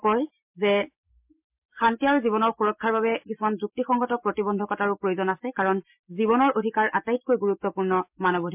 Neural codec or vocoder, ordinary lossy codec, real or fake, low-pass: none; MP3, 32 kbps; real; 3.6 kHz